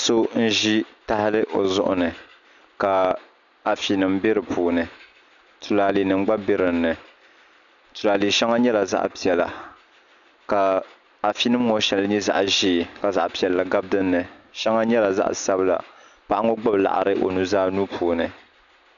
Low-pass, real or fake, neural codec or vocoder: 7.2 kHz; real; none